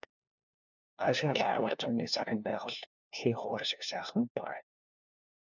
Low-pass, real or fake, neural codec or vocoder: 7.2 kHz; fake; codec, 16 kHz, 1 kbps, FunCodec, trained on LibriTTS, 50 frames a second